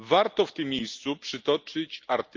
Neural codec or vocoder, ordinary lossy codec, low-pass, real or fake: none; Opus, 24 kbps; 7.2 kHz; real